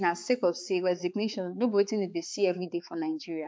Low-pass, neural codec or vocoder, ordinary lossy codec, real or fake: none; codec, 16 kHz, 4 kbps, X-Codec, HuBERT features, trained on balanced general audio; none; fake